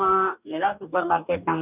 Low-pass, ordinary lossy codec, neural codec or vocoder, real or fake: 3.6 kHz; none; codec, 44.1 kHz, 2.6 kbps, DAC; fake